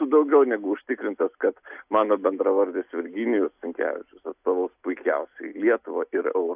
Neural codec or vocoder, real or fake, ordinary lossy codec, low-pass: none; real; AAC, 32 kbps; 3.6 kHz